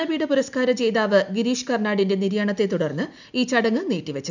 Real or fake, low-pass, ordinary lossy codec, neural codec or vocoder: real; 7.2 kHz; none; none